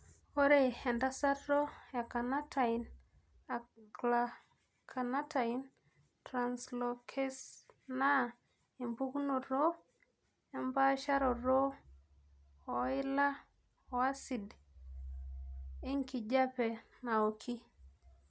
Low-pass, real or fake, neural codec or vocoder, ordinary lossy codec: none; real; none; none